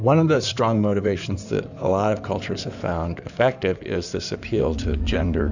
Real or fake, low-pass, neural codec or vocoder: fake; 7.2 kHz; codec, 16 kHz in and 24 kHz out, 2.2 kbps, FireRedTTS-2 codec